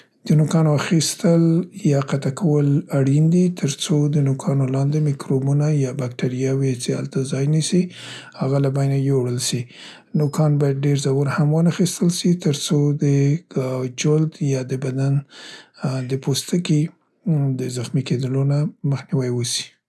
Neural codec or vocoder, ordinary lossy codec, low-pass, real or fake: none; none; none; real